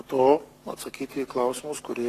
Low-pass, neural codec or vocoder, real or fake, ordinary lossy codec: 14.4 kHz; codec, 44.1 kHz, 7.8 kbps, Pupu-Codec; fake; AAC, 48 kbps